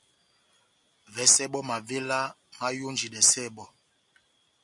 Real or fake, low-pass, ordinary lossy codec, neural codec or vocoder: real; 10.8 kHz; MP3, 96 kbps; none